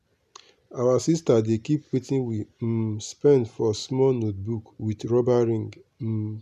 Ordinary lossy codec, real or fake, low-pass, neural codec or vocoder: none; real; 14.4 kHz; none